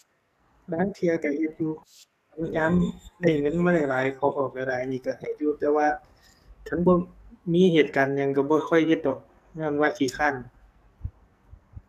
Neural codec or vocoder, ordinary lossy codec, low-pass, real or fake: codec, 44.1 kHz, 2.6 kbps, SNAC; none; 14.4 kHz; fake